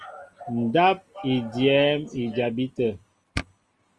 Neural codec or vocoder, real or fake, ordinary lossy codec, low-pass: none; real; Opus, 24 kbps; 10.8 kHz